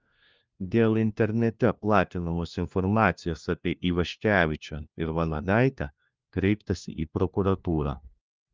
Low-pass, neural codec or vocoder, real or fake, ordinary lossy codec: 7.2 kHz; codec, 16 kHz, 1 kbps, FunCodec, trained on LibriTTS, 50 frames a second; fake; Opus, 24 kbps